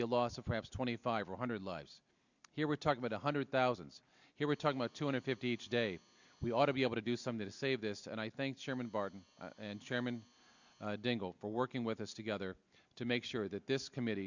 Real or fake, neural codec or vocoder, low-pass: real; none; 7.2 kHz